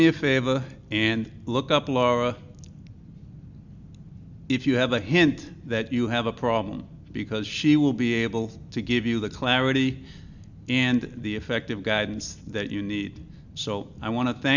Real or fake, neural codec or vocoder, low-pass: real; none; 7.2 kHz